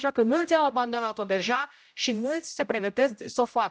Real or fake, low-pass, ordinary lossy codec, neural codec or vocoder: fake; none; none; codec, 16 kHz, 0.5 kbps, X-Codec, HuBERT features, trained on general audio